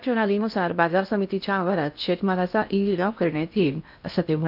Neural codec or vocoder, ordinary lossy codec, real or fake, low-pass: codec, 16 kHz in and 24 kHz out, 0.6 kbps, FocalCodec, streaming, 2048 codes; none; fake; 5.4 kHz